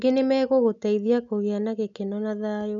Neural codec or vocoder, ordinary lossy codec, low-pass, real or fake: none; none; 7.2 kHz; real